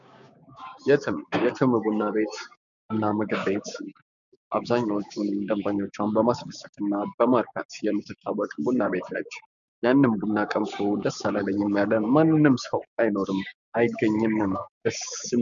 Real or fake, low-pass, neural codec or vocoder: fake; 7.2 kHz; codec, 16 kHz, 6 kbps, DAC